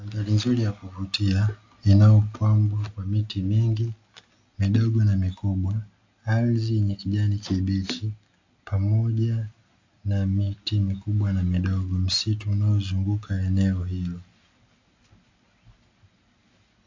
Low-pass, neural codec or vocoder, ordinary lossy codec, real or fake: 7.2 kHz; none; AAC, 48 kbps; real